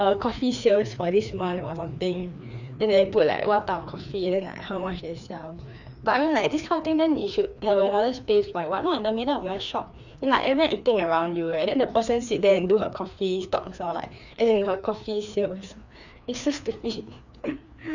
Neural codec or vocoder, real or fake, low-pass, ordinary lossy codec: codec, 16 kHz, 2 kbps, FreqCodec, larger model; fake; 7.2 kHz; none